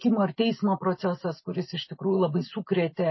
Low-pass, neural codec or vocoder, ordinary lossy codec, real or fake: 7.2 kHz; none; MP3, 24 kbps; real